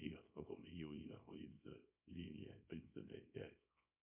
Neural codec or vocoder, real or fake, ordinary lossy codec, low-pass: codec, 24 kHz, 0.9 kbps, WavTokenizer, small release; fake; MP3, 32 kbps; 3.6 kHz